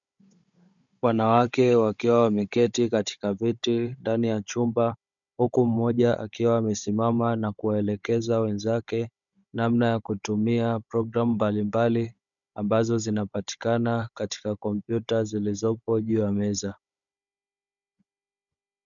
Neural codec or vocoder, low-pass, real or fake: codec, 16 kHz, 16 kbps, FunCodec, trained on Chinese and English, 50 frames a second; 7.2 kHz; fake